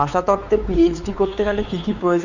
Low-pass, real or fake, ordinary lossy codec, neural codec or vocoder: 7.2 kHz; fake; Opus, 64 kbps; codec, 16 kHz, 4 kbps, X-Codec, WavLM features, trained on Multilingual LibriSpeech